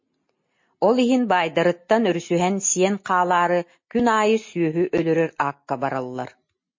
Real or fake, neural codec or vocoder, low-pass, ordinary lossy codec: real; none; 7.2 kHz; MP3, 32 kbps